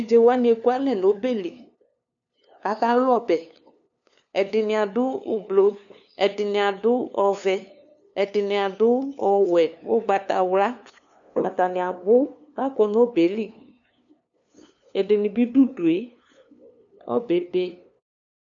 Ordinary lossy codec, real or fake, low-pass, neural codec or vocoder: MP3, 96 kbps; fake; 7.2 kHz; codec, 16 kHz, 2 kbps, FunCodec, trained on LibriTTS, 25 frames a second